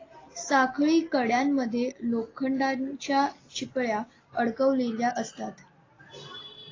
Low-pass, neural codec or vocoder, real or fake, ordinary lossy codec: 7.2 kHz; none; real; AAC, 32 kbps